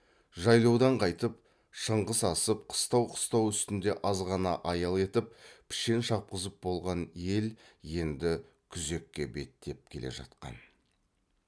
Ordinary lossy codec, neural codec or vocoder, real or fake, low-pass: none; none; real; none